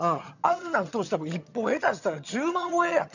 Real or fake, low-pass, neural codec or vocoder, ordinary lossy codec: fake; 7.2 kHz; vocoder, 22.05 kHz, 80 mel bands, HiFi-GAN; none